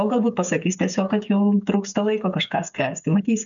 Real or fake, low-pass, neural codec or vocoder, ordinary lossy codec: fake; 7.2 kHz; codec, 16 kHz, 8 kbps, FreqCodec, smaller model; MP3, 96 kbps